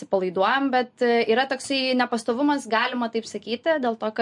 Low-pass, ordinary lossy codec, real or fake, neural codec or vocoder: 10.8 kHz; MP3, 48 kbps; real; none